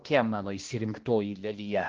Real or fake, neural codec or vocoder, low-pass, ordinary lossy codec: fake; codec, 16 kHz, 1 kbps, X-Codec, HuBERT features, trained on balanced general audio; 7.2 kHz; Opus, 16 kbps